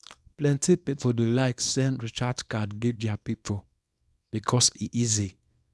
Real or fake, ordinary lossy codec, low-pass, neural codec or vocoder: fake; none; none; codec, 24 kHz, 0.9 kbps, WavTokenizer, small release